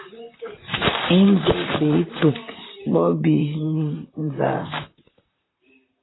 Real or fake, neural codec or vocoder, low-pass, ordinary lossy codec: fake; vocoder, 44.1 kHz, 128 mel bands, Pupu-Vocoder; 7.2 kHz; AAC, 16 kbps